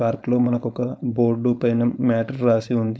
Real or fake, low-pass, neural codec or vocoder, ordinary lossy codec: fake; none; codec, 16 kHz, 4.8 kbps, FACodec; none